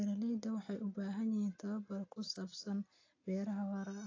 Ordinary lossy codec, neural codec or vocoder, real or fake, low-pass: AAC, 32 kbps; none; real; 7.2 kHz